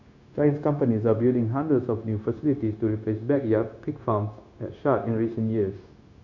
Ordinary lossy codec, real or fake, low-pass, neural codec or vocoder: none; fake; 7.2 kHz; codec, 16 kHz, 0.9 kbps, LongCat-Audio-Codec